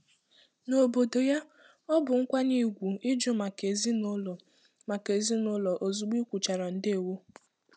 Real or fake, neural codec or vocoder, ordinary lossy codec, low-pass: real; none; none; none